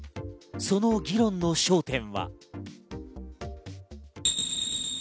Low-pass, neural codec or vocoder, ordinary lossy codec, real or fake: none; none; none; real